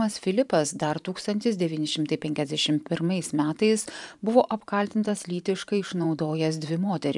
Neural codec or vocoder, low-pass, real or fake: vocoder, 44.1 kHz, 128 mel bands every 512 samples, BigVGAN v2; 10.8 kHz; fake